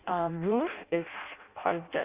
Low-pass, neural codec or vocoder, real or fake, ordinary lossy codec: 3.6 kHz; codec, 16 kHz in and 24 kHz out, 0.6 kbps, FireRedTTS-2 codec; fake; Opus, 64 kbps